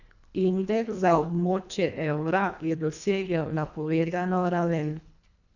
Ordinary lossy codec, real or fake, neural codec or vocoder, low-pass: none; fake; codec, 24 kHz, 1.5 kbps, HILCodec; 7.2 kHz